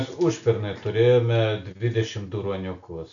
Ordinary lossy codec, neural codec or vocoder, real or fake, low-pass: MP3, 96 kbps; none; real; 7.2 kHz